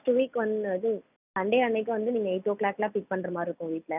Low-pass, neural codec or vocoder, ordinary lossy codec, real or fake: 3.6 kHz; none; none; real